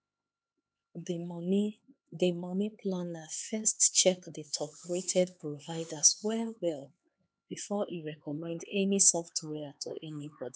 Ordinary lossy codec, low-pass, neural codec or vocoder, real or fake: none; none; codec, 16 kHz, 4 kbps, X-Codec, HuBERT features, trained on LibriSpeech; fake